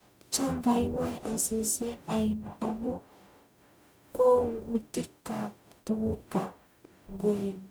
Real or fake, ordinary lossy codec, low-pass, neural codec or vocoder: fake; none; none; codec, 44.1 kHz, 0.9 kbps, DAC